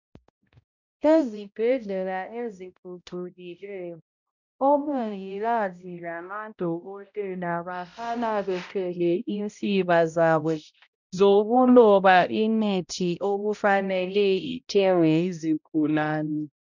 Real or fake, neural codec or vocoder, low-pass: fake; codec, 16 kHz, 0.5 kbps, X-Codec, HuBERT features, trained on balanced general audio; 7.2 kHz